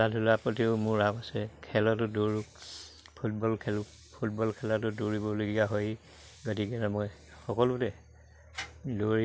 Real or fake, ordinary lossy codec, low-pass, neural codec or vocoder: real; none; none; none